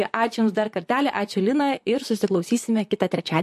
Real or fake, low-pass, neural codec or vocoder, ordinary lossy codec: fake; 14.4 kHz; vocoder, 44.1 kHz, 128 mel bands, Pupu-Vocoder; MP3, 64 kbps